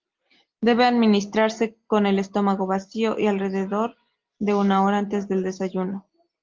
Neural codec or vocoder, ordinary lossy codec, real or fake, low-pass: none; Opus, 32 kbps; real; 7.2 kHz